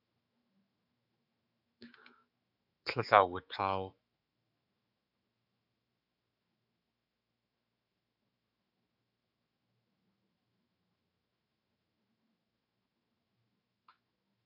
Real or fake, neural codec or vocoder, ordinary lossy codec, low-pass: fake; autoencoder, 48 kHz, 128 numbers a frame, DAC-VAE, trained on Japanese speech; AAC, 48 kbps; 5.4 kHz